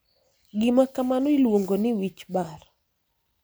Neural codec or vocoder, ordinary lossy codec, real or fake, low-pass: none; none; real; none